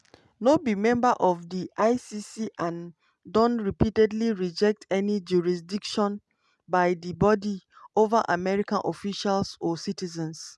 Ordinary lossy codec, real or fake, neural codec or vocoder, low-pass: none; real; none; none